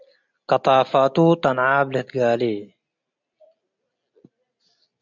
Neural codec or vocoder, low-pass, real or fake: none; 7.2 kHz; real